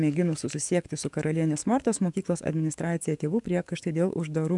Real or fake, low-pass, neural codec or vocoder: fake; 10.8 kHz; codec, 44.1 kHz, 7.8 kbps, DAC